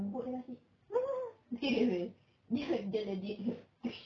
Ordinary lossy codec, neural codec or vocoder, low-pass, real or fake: AAC, 64 kbps; none; 7.2 kHz; real